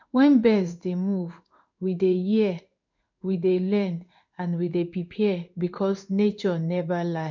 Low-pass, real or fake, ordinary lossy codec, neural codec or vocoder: 7.2 kHz; fake; none; codec, 16 kHz in and 24 kHz out, 1 kbps, XY-Tokenizer